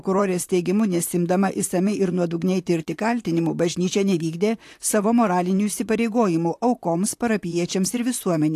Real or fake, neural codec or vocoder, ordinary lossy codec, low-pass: fake; vocoder, 44.1 kHz, 128 mel bands, Pupu-Vocoder; AAC, 64 kbps; 14.4 kHz